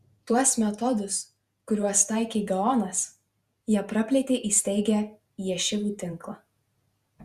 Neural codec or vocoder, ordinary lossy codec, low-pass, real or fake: vocoder, 48 kHz, 128 mel bands, Vocos; Opus, 64 kbps; 14.4 kHz; fake